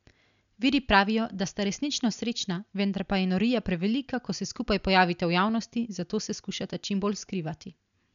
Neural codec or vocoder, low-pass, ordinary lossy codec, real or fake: none; 7.2 kHz; none; real